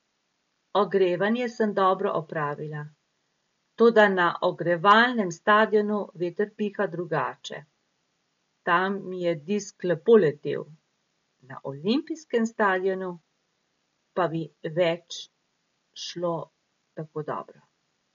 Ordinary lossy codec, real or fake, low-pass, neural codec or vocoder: MP3, 48 kbps; real; 7.2 kHz; none